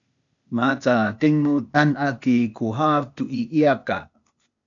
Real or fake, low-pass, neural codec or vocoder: fake; 7.2 kHz; codec, 16 kHz, 0.8 kbps, ZipCodec